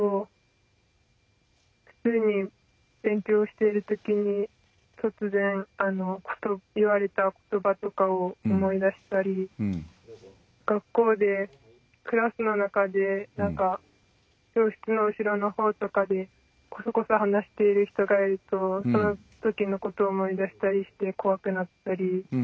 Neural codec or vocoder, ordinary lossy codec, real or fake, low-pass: none; none; real; none